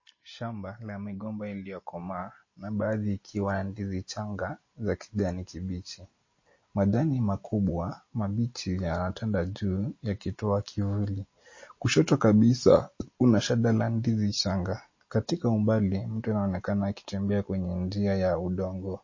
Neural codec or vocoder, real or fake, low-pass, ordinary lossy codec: none; real; 7.2 kHz; MP3, 32 kbps